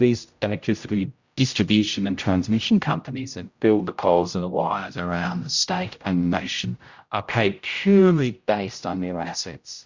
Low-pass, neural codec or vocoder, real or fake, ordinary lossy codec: 7.2 kHz; codec, 16 kHz, 0.5 kbps, X-Codec, HuBERT features, trained on general audio; fake; Opus, 64 kbps